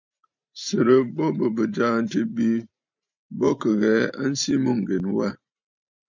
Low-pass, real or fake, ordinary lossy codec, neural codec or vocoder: 7.2 kHz; fake; MP3, 64 kbps; vocoder, 44.1 kHz, 128 mel bands every 512 samples, BigVGAN v2